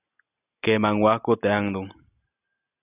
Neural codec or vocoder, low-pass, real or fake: none; 3.6 kHz; real